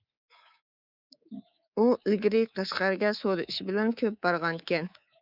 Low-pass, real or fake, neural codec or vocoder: 5.4 kHz; fake; codec, 24 kHz, 3.1 kbps, DualCodec